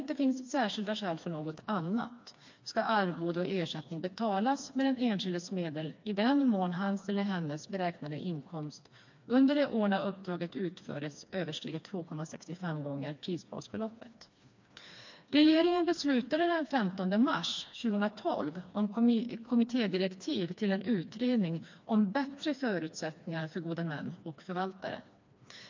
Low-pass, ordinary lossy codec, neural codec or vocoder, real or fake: 7.2 kHz; MP3, 48 kbps; codec, 16 kHz, 2 kbps, FreqCodec, smaller model; fake